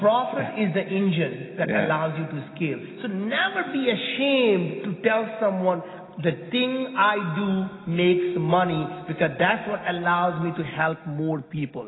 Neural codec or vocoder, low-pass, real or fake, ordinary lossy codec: none; 7.2 kHz; real; AAC, 16 kbps